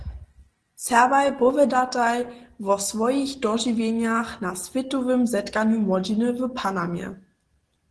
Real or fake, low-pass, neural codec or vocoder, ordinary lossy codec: real; 10.8 kHz; none; Opus, 16 kbps